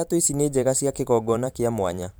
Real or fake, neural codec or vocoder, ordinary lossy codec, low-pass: fake; vocoder, 44.1 kHz, 128 mel bands every 512 samples, BigVGAN v2; none; none